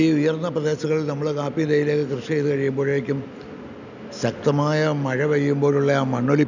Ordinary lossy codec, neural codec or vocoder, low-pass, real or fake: none; none; 7.2 kHz; real